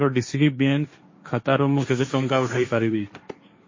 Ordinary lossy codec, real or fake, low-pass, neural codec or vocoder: MP3, 32 kbps; fake; 7.2 kHz; codec, 16 kHz, 1.1 kbps, Voila-Tokenizer